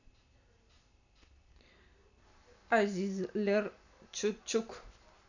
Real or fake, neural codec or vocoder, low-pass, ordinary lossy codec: real; none; 7.2 kHz; none